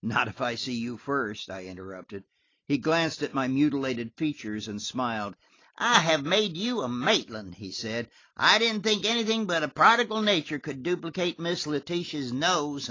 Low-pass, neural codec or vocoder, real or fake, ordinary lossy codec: 7.2 kHz; none; real; AAC, 32 kbps